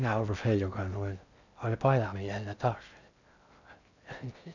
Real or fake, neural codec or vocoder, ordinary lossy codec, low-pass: fake; codec, 16 kHz in and 24 kHz out, 0.6 kbps, FocalCodec, streaming, 4096 codes; none; 7.2 kHz